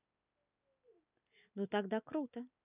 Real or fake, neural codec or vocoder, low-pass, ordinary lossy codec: real; none; 3.6 kHz; none